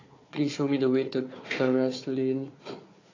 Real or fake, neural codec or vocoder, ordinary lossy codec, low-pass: fake; codec, 16 kHz, 4 kbps, FunCodec, trained on Chinese and English, 50 frames a second; AAC, 32 kbps; 7.2 kHz